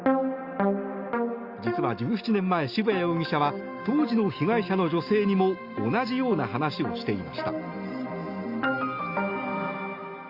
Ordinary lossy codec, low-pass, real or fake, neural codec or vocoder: Opus, 64 kbps; 5.4 kHz; real; none